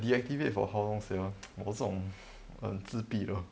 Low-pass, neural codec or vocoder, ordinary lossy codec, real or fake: none; none; none; real